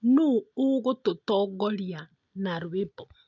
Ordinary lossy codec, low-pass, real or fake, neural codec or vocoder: none; 7.2 kHz; real; none